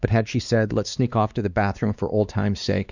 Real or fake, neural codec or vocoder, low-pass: fake; codec, 16 kHz, 2 kbps, X-Codec, WavLM features, trained on Multilingual LibriSpeech; 7.2 kHz